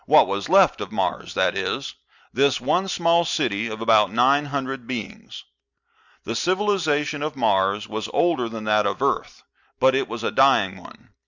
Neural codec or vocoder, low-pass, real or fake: none; 7.2 kHz; real